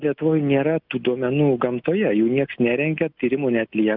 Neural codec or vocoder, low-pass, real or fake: none; 5.4 kHz; real